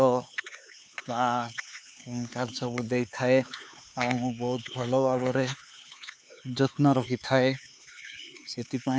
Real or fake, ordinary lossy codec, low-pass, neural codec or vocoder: fake; none; none; codec, 16 kHz, 4 kbps, X-Codec, HuBERT features, trained on LibriSpeech